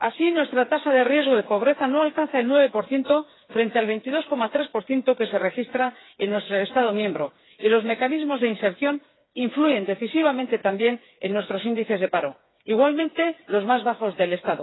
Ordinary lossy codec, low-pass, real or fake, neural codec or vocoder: AAC, 16 kbps; 7.2 kHz; fake; codec, 16 kHz, 4 kbps, FreqCodec, smaller model